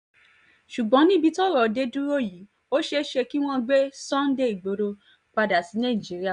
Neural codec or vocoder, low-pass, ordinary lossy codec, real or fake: none; 9.9 kHz; none; real